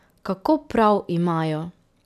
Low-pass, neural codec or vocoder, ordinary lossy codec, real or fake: 14.4 kHz; none; none; real